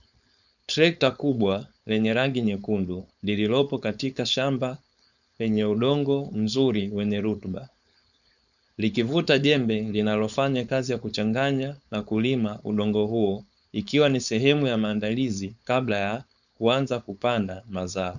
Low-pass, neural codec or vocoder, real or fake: 7.2 kHz; codec, 16 kHz, 4.8 kbps, FACodec; fake